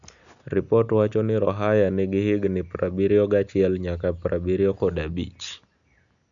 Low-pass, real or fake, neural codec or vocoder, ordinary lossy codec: 7.2 kHz; real; none; none